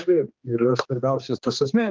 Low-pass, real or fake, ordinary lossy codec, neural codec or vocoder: 7.2 kHz; fake; Opus, 32 kbps; codec, 16 kHz, 2 kbps, X-Codec, HuBERT features, trained on general audio